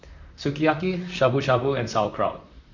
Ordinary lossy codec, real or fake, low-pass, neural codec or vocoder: MP3, 64 kbps; fake; 7.2 kHz; vocoder, 44.1 kHz, 128 mel bands, Pupu-Vocoder